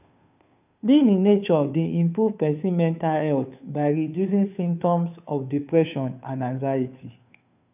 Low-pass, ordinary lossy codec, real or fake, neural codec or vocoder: 3.6 kHz; none; fake; codec, 16 kHz, 2 kbps, FunCodec, trained on Chinese and English, 25 frames a second